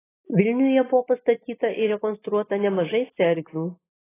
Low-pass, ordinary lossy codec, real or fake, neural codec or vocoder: 3.6 kHz; AAC, 16 kbps; real; none